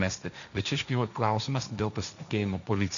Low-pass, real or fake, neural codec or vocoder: 7.2 kHz; fake; codec, 16 kHz, 1.1 kbps, Voila-Tokenizer